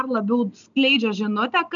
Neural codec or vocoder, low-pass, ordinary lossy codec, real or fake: none; 7.2 kHz; MP3, 96 kbps; real